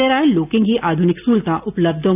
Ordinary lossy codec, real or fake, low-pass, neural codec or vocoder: none; real; 3.6 kHz; none